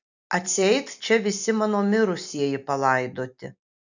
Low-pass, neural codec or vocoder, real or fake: 7.2 kHz; none; real